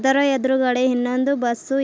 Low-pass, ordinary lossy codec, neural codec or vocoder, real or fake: none; none; none; real